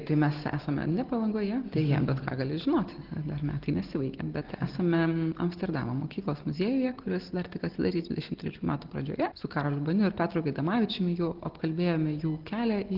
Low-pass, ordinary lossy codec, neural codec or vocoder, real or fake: 5.4 kHz; Opus, 16 kbps; none; real